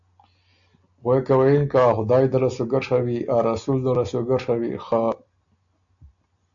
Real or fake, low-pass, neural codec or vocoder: real; 7.2 kHz; none